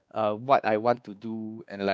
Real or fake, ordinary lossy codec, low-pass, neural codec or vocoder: fake; none; none; codec, 16 kHz, 2 kbps, X-Codec, WavLM features, trained on Multilingual LibriSpeech